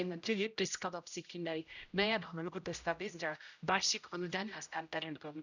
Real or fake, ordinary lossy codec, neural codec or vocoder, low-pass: fake; none; codec, 16 kHz, 0.5 kbps, X-Codec, HuBERT features, trained on general audio; 7.2 kHz